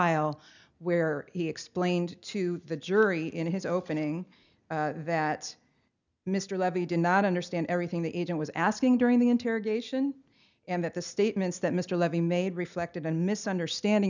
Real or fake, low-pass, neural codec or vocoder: real; 7.2 kHz; none